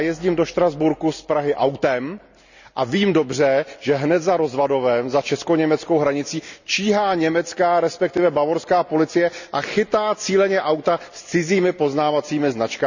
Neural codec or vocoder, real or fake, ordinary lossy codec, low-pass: none; real; none; 7.2 kHz